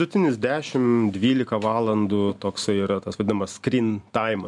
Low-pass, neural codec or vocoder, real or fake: 10.8 kHz; none; real